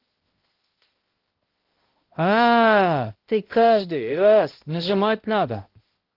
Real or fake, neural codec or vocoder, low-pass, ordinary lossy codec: fake; codec, 16 kHz, 0.5 kbps, X-Codec, HuBERT features, trained on balanced general audio; 5.4 kHz; Opus, 16 kbps